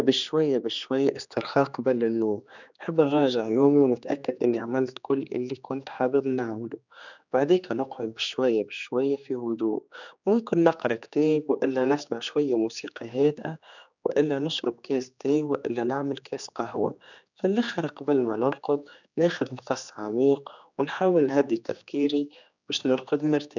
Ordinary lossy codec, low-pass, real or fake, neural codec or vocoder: none; 7.2 kHz; fake; codec, 16 kHz, 2 kbps, X-Codec, HuBERT features, trained on general audio